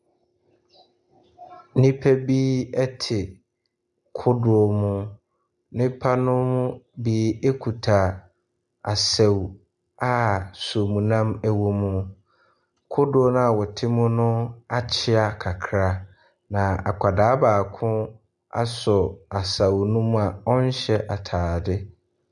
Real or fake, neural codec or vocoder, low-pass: real; none; 10.8 kHz